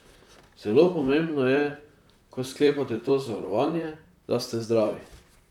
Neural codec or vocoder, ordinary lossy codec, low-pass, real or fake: vocoder, 44.1 kHz, 128 mel bands, Pupu-Vocoder; none; 19.8 kHz; fake